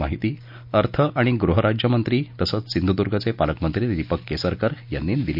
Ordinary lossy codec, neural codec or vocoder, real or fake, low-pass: none; none; real; 5.4 kHz